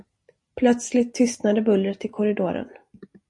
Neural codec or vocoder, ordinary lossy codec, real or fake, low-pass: none; MP3, 48 kbps; real; 10.8 kHz